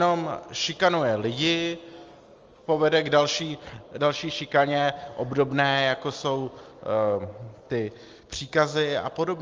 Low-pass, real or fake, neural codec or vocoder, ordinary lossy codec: 7.2 kHz; real; none; Opus, 32 kbps